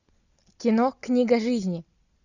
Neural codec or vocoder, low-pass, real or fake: none; 7.2 kHz; real